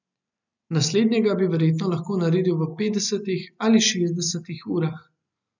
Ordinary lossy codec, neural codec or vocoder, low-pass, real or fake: none; none; 7.2 kHz; real